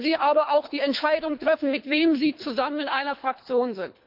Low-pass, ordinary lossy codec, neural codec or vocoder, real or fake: 5.4 kHz; MP3, 48 kbps; codec, 24 kHz, 3 kbps, HILCodec; fake